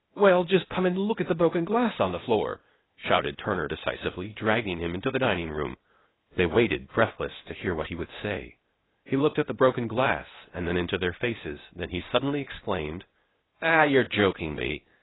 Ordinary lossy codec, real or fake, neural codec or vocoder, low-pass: AAC, 16 kbps; fake; codec, 16 kHz, 0.8 kbps, ZipCodec; 7.2 kHz